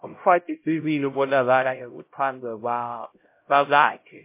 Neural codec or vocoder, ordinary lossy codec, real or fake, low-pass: codec, 16 kHz, 0.5 kbps, X-Codec, HuBERT features, trained on LibriSpeech; MP3, 24 kbps; fake; 3.6 kHz